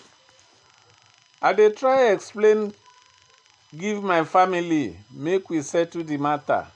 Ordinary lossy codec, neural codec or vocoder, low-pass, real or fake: AAC, 64 kbps; none; 9.9 kHz; real